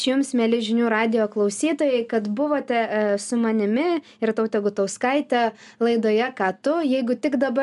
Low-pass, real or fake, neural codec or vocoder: 10.8 kHz; real; none